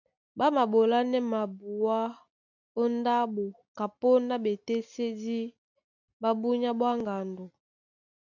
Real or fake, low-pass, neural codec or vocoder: real; 7.2 kHz; none